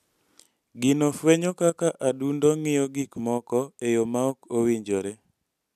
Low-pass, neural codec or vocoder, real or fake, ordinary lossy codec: 14.4 kHz; none; real; none